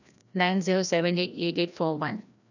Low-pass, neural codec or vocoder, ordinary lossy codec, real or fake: 7.2 kHz; codec, 16 kHz, 1 kbps, FreqCodec, larger model; none; fake